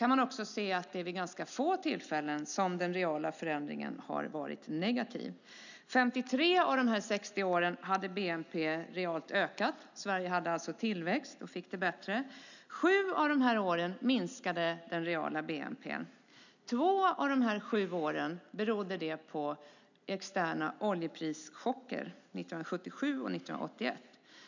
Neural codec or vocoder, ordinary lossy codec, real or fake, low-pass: none; none; real; 7.2 kHz